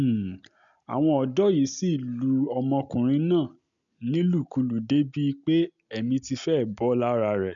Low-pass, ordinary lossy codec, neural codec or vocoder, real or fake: 7.2 kHz; none; none; real